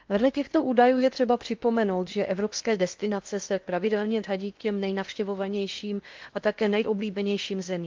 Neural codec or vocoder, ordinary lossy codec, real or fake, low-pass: codec, 16 kHz in and 24 kHz out, 0.8 kbps, FocalCodec, streaming, 65536 codes; Opus, 24 kbps; fake; 7.2 kHz